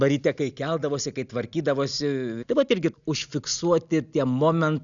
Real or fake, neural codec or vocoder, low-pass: real; none; 7.2 kHz